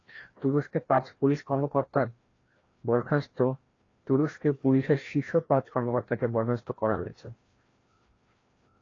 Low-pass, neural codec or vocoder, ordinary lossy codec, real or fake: 7.2 kHz; codec, 16 kHz, 1 kbps, FreqCodec, larger model; AAC, 32 kbps; fake